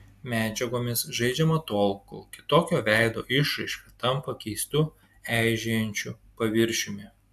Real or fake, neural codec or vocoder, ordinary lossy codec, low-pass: real; none; AAC, 96 kbps; 14.4 kHz